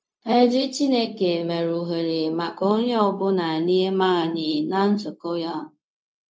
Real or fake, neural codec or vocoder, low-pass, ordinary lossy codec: fake; codec, 16 kHz, 0.4 kbps, LongCat-Audio-Codec; none; none